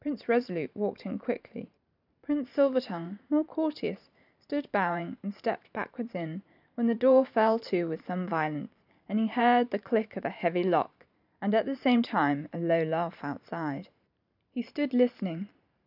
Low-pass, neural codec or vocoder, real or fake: 5.4 kHz; vocoder, 44.1 kHz, 128 mel bands every 512 samples, BigVGAN v2; fake